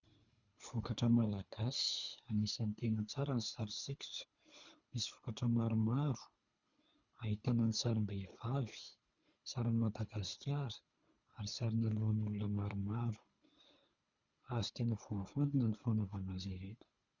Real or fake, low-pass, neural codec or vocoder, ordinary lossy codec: fake; 7.2 kHz; codec, 24 kHz, 3 kbps, HILCodec; Opus, 64 kbps